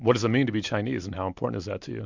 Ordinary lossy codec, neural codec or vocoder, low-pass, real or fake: MP3, 64 kbps; none; 7.2 kHz; real